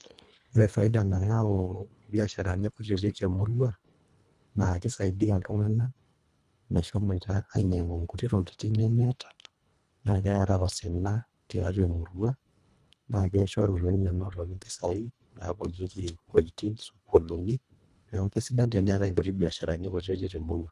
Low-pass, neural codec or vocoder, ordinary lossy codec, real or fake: none; codec, 24 kHz, 1.5 kbps, HILCodec; none; fake